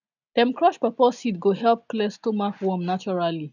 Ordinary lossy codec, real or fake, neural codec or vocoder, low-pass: none; real; none; 7.2 kHz